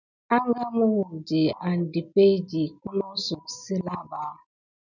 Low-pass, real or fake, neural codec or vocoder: 7.2 kHz; real; none